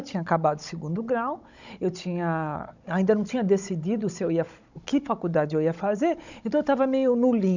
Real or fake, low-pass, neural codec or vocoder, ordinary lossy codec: fake; 7.2 kHz; codec, 16 kHz, 16 kbps, FunCodec, trained on Chinese and English, 50 frames a second; none